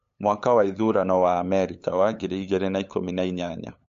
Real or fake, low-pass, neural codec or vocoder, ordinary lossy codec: fake; 7.2 kHz; codec, 16 kHz, 8 kbps, FunCodec, trained on LibriTTS, 25 frames a second; MP3, 48 kbps